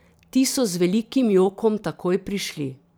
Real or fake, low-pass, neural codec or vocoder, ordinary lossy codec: fake; none; vocoder, 44.1 kHz, 128 mel bands every 512 samples, BigVGAN v2; none